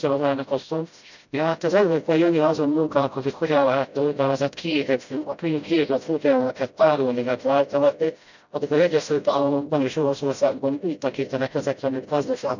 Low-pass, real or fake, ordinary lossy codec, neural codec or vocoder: 7.2 kHz; fake; none; codec, 16 kHz, 0.5 kbps, FreqCodec, smaller model